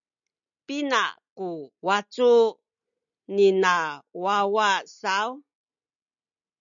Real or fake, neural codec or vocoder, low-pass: real; none; 7.2 kHz